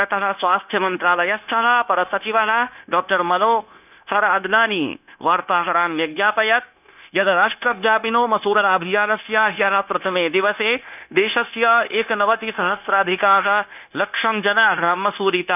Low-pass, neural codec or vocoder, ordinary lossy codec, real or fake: 3.6 kHz; codec, 16 kHz, 0.9 kbps, LongCat-Audio-Codec; none; fake